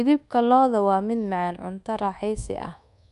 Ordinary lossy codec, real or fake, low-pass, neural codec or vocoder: none; fake; 10.8 kHz; codec, 24 kHz, 1.2 kbps, DualCodec